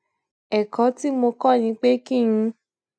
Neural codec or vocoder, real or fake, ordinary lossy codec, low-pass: none; real; none; none